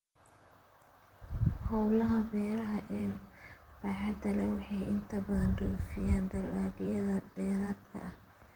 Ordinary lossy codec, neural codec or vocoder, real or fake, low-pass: Opus, 32 kbps; vocoder, 44.1 kHz, 128 mel bands, Pupu-Vocoder; fake; 19.8 kHz